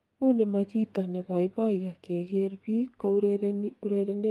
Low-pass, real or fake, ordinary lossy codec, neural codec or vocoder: 14.4 kHz; fake; Opus, 32 kbps; codec, 32 kHz, 1.9 kbps, SNAC